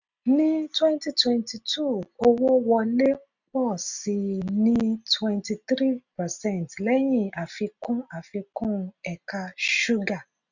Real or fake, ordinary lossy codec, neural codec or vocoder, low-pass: real; none; none; 7.2 kHz